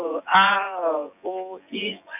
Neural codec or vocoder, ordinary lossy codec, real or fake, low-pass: vocoder, 24 kHz, 100 mel bands, Vocos; none; fake; 3.6 kHz